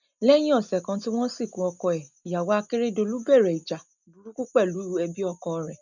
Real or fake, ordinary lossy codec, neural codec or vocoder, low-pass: real; none; none; 7.2 kHz